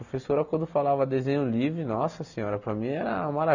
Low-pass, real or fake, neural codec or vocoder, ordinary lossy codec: 7.2 kHz; real; none; none